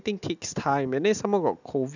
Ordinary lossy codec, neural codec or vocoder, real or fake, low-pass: none; none; real; 7.2 kHz